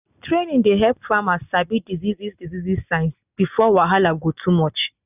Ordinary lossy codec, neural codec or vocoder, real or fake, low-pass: none; none; real; 3.6 kHz